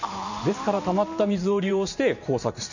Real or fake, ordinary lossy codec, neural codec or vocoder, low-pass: fake; none; vocoder, 44.1 kHz, 128 mel bands every 512 samples, BigVGAN v2; 7.2 kHz